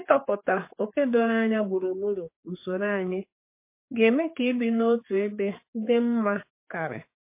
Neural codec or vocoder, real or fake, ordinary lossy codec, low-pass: codec, 16 kHz, 4 kbps, X-Codec, HuBERT features, trained on general audio; fake; MP3, 24 kbps; 3.6 kHz